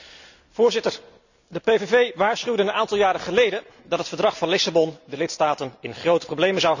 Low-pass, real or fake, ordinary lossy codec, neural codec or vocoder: 7.2 kHz; real; none; none